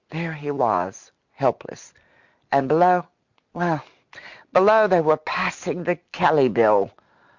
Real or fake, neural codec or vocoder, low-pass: real; none; 7.2 kHz